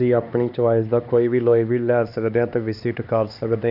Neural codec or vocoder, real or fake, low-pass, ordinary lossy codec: codec, 16 kHz, 2 kbps, X-Codec, HuBERT features, trained on LibriSpeech; fake; 5.4 kHz; none